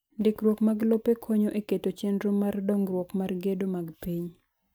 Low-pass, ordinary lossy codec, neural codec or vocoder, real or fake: none; none; none; real